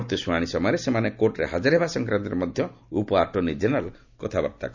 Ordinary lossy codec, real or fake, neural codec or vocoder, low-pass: none; real; none; 7.2 kHz